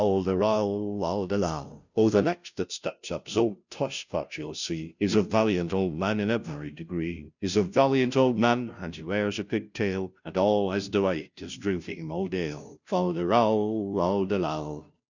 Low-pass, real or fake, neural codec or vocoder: 7.2 kHz; fake; codec, 16 kHz, 0.5 kbps, FunCodec, trained on Chinese and English, 25 frames a second